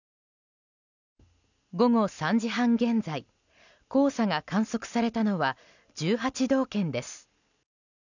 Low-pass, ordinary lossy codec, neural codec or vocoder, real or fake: 7.2 kHz; none; none; real